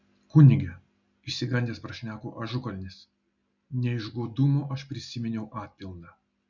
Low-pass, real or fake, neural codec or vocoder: 7.2 kHz; real; none